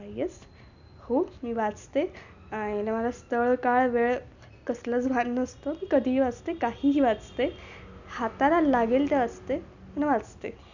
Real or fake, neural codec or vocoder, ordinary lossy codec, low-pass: real; none; none; 7.2 kHz